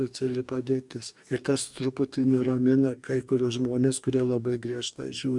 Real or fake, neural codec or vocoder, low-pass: fake; codec, 44.1 kHz, 2.6 kbps, DAC; 10.8 kHz